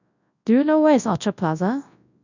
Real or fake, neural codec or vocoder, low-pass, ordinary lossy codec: fake; codec, 24 kHz, 0.9 kbps, WavTokenizer, large speech release; 7.2 kHz; none